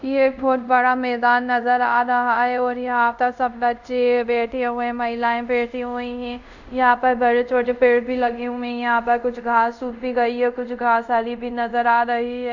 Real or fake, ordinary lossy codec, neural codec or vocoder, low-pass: fake; none; codec, 24 kHz, 0.5 kbps, DualCodec; 7.2 kHz